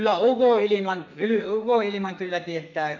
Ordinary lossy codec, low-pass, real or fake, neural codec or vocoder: none; 7.2 kHz; fake; codec, 32 kHz, 1.9 kbps, SNAC